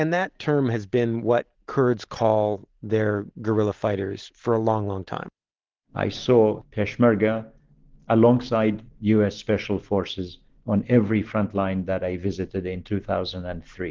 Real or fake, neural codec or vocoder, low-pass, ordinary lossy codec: real; none; 7.2 kHz; Opus, 16 kbps